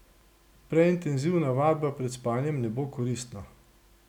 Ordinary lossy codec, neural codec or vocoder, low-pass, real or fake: none; none; 19.8 kHz; real